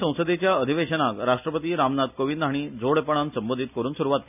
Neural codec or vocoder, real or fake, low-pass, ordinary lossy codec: none; real; 3.6 kHz; none